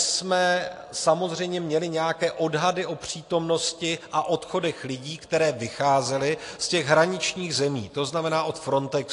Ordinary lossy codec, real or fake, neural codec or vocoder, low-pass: AAC, 48 kbps; real; none; 10.8 kHz